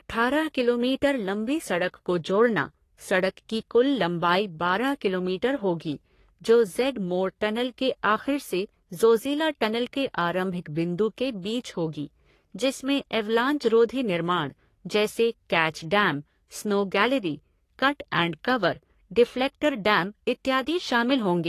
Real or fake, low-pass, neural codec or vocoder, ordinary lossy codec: fake; 14.4 kHz; codec, 44.1 kHz, 3.4 kbps, Pupu-Codec; AAC, 48 kbps